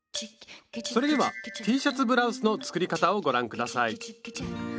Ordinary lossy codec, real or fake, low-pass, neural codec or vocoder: none; real; none; none